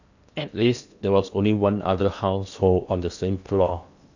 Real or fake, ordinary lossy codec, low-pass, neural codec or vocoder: fake; none; 7.2 kHz; codec, 16 kHz in and 24 kHz out, 0.8 kbps, FocalCodec, streaming, 65536 codes